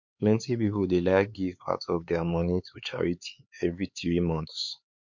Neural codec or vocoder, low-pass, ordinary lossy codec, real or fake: codec, 16 kHz, 4 kbps, X-Codec, HuBERT features, trained on LibriSpeech; 7.2 kHz; MP3, 48 kbps; fake